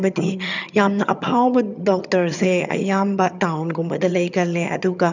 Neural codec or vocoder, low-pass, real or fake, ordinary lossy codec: vocoder, 22.05 kHz, 80 mel bands, HiFi-GAN; 7.2 kHz; fake; none